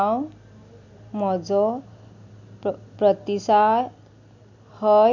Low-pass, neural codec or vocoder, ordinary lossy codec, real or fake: 7.2 kHz; none; none; real